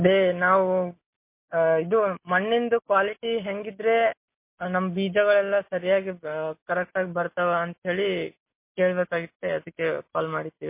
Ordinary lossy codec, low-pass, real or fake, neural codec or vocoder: MP3, 24 kbps; 3.6 kHz; real; none